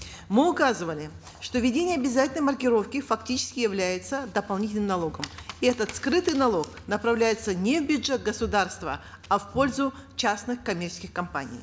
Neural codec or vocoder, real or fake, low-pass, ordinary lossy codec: none; real; none; none